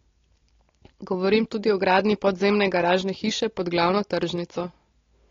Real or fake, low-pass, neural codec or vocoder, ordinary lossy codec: real; 7.2 kHz; none; AAC, 32 kbps